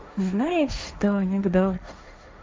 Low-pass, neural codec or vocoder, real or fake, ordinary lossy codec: none; codec, 16 kHz, 1.1 kbps, Voila-Tokenizer; fake; none